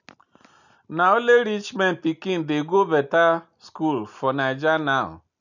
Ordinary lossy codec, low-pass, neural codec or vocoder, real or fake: none; 7.2 kHz; none; real